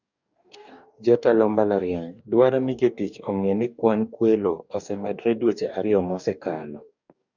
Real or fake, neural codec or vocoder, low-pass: fake; codec, 44.1 kHz, 2.6 kbps, DAC; 7.2 kHz